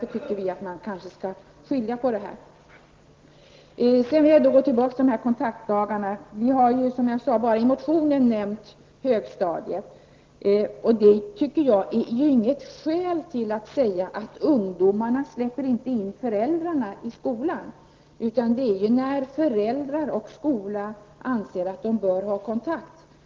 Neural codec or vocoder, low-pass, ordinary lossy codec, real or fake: none; 7.2 kHz; Opus, 16 kbps; real